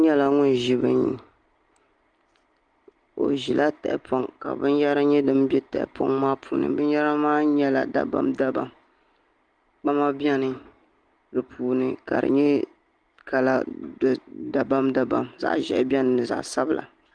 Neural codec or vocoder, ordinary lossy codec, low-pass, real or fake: none; Opus, 24 kbps; 9.9 kHz; real